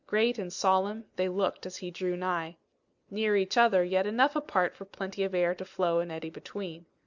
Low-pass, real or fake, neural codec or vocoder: 7.2 kHz; real; none